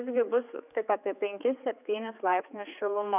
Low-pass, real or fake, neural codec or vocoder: 3.6 kHz; fake; codec, 16 kHz, 4 kbps, X-Codec, HuBERT features, trained on general audio